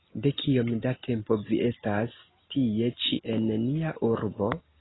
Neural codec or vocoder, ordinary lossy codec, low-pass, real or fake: none; AAC, 16 kbps; 7.2 kHz; real